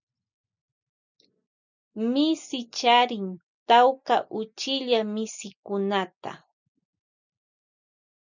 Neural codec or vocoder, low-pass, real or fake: none; 7.2 kHz; real